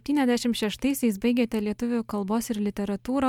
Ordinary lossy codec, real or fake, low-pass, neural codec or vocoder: MP3, 96 kbps; real; 19.8 kHz; none